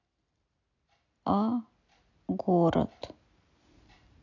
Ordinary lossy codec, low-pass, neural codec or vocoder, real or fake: AAC, 48 kbps; 7.2 kHz; none; real